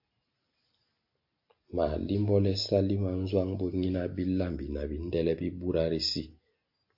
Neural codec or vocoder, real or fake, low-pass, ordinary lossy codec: none; real; 5.4 kHz; MP3, 32 kbps